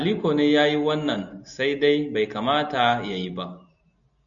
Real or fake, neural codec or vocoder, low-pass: real; none; 7.2 kHz